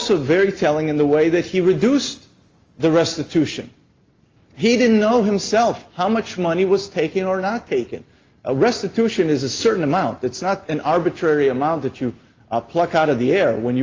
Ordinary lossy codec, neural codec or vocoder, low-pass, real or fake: Opus, 32 kbps; none; 7.2 kHz; real